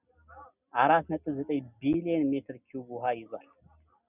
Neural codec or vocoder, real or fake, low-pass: none; real; 3.6 kHz